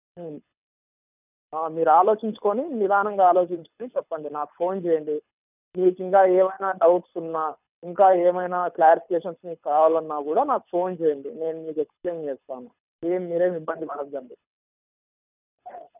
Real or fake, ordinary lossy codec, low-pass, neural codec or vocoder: fake; none; 3.6 kHz; codec, 24 kHz, 6 kbps, HILCodec